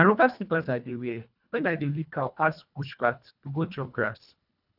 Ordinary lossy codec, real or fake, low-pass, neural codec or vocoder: none; fake; 5.4 kHz; codec, 24 kHz, 1.5 kbps, HILCodec